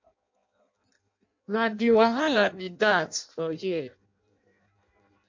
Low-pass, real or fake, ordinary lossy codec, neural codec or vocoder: 7.2 kHz; fake; MP3, 48 kbps; codec, 16 kHz in and 24 kHz out, 0.6 kbps, FireRedTTS-2 codec